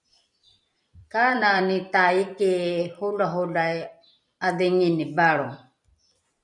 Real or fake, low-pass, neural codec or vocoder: fake; 10.8 kHz; vocoder, 44.1 kHz, 128 mel bands every 256 samples, BigVGAN v2